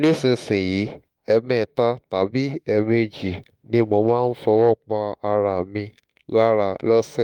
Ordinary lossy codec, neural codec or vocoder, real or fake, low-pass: Opus, 32 kbps; autoencoder, 48 kHz, 32 numbers a frame, DAC-VAE, trained on Japanese speech; fake; 19.8 kHz